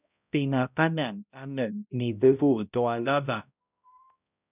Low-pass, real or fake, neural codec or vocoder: 3.6 kHz; fake; codec, 16 kHz, 0.5 kbps, X-Codec, HuBERT features, trained on balanced general audio